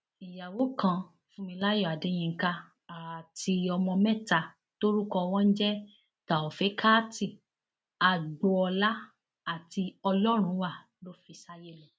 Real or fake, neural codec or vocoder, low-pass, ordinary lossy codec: real; none; none; none